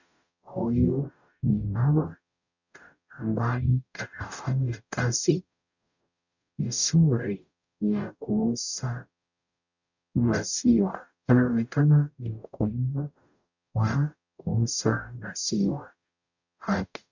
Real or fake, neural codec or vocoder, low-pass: fake; codec, 44.1 kHz, 0.9 kbps, DAC; 7.2 kHz